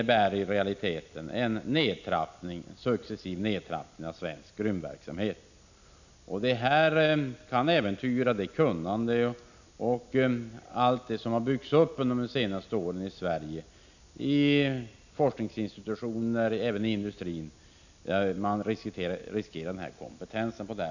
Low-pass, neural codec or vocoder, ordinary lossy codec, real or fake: 7.2 kHz; none; none; real